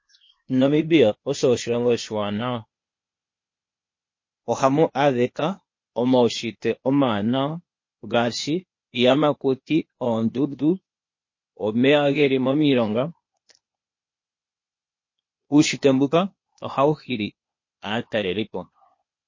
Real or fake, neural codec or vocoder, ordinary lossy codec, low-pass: fake; codec, 16 kHz, 0.8 kbps, ZipCodec; MP3, 32 kbps; 7.2 kHz